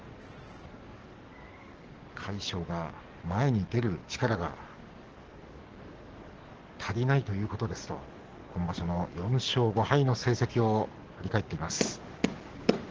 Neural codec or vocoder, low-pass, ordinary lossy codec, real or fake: codec, 44.1 kHz, 7.8 kbps, DAC; 7.2 kHz; Opus, 16 kbps; fake